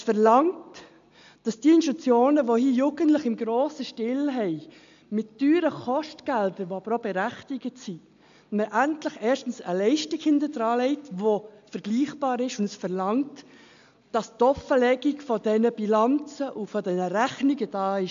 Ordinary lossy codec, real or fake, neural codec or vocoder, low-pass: AAC, 64 kbps; real; none; 7.2 kHz